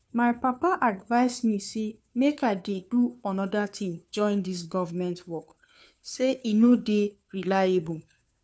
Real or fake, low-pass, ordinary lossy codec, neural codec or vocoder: fake; none; none; codec, 16 kHz, 2 kbps, FunCodec, trained on Chinese and English, 25 frames a second